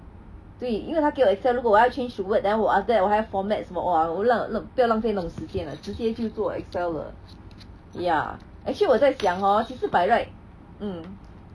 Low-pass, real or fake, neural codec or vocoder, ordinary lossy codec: none; real; none; none